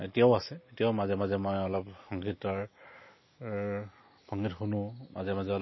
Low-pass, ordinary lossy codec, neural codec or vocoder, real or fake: 7.2 kHz; MP3, 24 kbps; none; real